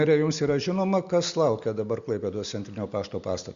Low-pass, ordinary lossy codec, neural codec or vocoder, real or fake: 7.2 kHz; Opus, 64 kbps; none; real